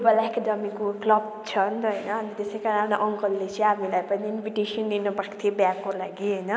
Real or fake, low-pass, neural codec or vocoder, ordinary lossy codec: real; none; none; none